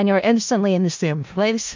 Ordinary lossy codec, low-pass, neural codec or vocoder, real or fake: MP3, 64 kbps; 7.2 kHz; codec, 16 kHz in and 24 kHz out, 0.4 kbps, LongCat-Audio-Codec, four codebook decoder; fake